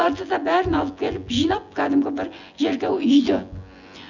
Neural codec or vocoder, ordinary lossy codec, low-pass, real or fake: vocoder, 24 kHz, 100 mel bands, Vocos; none; 7.2 kHz; fake